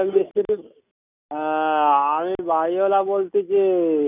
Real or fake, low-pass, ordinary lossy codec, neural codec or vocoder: real; 3.6 kHz; none; none